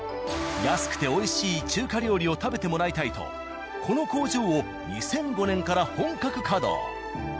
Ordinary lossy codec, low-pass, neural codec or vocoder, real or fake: none; none; none; real